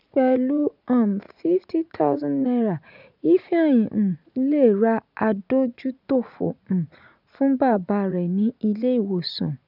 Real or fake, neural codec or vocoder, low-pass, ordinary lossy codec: fake; vocoder, 44.1 kHz, 80 mel bands, Vocos; 5.4 kHz; none